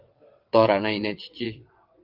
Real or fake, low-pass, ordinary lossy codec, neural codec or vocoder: fake; 5.4 kHz; Opus, 32 kbps; vocoder, 22.05 kHz, 80 mel bands, WaveNeXt